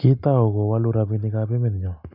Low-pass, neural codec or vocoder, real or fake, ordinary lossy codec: 5.4 kHz; none; real; none